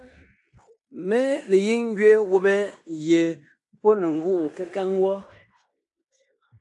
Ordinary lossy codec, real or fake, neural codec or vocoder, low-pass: AAC, 64 kbps; fake; codec, 16 kHz in and 24 kHz out, 0.9 kbps, LongCat-Audio-Codec, fine tuned four codebook decoder; 10.8 kHz